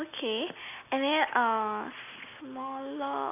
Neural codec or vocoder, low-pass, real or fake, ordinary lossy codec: none; 3.6 kHz; real; none